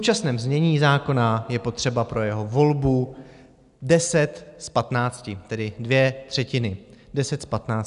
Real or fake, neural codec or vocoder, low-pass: real; none; 10.8 kHz